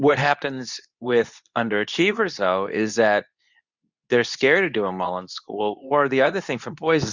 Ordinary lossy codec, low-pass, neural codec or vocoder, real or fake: Opus, 64 kbps; 7.2 kHz; codec, 24 kHz, 0.9 kbps, WavTokenizer, medium speech release version 2; fake